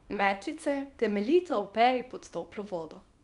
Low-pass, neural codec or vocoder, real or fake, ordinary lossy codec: 10.8 kHz; codec, 24 kHz, 0.9 kbps, WavTokenizer, small release; fake; none